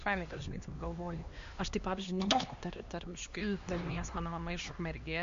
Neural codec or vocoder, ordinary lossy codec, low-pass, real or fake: codec, 16 kHz, 2 kbps, X-Codec, HuBERT features, trained on LibriSpeech; MP3, 48 kbps; 7.2 kHz; fake